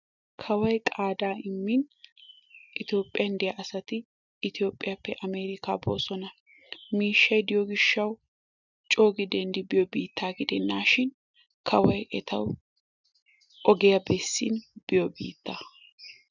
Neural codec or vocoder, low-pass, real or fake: none; 7.2 kHz; real